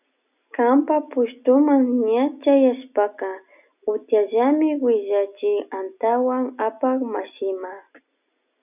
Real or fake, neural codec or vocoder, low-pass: real; none; 3.6 kHz